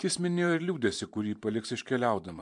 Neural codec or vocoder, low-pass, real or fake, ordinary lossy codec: vocoder, 44.1 kHz, 128 mel bands every 512 samples, BigVGAN v2; 10.8 kHz; fake; AAC, 64 kbps